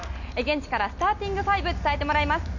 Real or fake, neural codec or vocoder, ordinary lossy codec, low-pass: real; none; none; 7.2 kHz